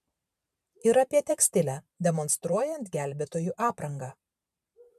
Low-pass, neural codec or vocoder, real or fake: 14.4 kHz; vocoder, 44.1 kHz, 128 mel bands every 512 samples, BigVGAN v2; fake